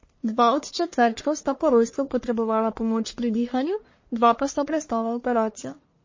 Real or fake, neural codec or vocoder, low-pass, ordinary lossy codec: fake; codec, 44.1 kHz, 1.7 kbps, Pupu-Codec; 7.2 kHz; MP3, 32 kbps